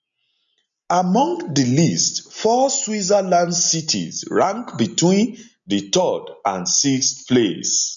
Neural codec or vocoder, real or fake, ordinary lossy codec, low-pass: none; real; none; 7.2 kHz